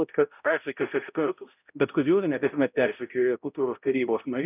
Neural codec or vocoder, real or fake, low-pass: codec, 16 kHz, 0.5 kbps, X-Codec, HuBERT features, trained on balanced general audio; fake; 3.6 kHz